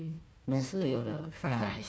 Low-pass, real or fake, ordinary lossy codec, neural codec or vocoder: none; fake; none; codec, 16 kHz, 1 kbps, FunCodec, trained on Chinese and English, 50 frames a second